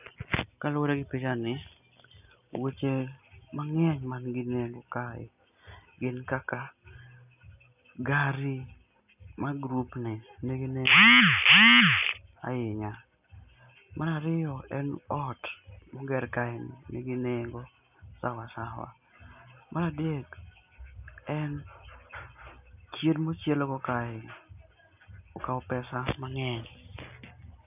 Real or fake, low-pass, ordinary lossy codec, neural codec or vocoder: real; 3.6 kHz; none; none